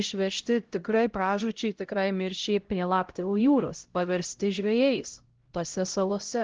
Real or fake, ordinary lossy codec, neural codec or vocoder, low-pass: fake; Opus, 16 kbps; codec, 16 kHz, 0.5 kbps, X-Codec, HuBERT features, trained on LibriSpeech; 7.2 kHz